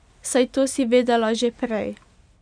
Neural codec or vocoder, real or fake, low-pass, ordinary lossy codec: none; real; 9.9 kHz; none